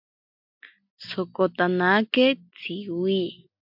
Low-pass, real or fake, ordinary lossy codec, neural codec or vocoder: 5.4 kHz; real; MP3, 48 kbps; none